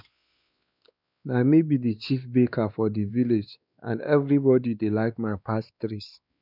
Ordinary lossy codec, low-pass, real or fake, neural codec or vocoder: none; 5.4 kHz; fake; codec, 16 kHz, 2 kbps, X-Codec, WavLM features, trained on Multilingual LibriSpeech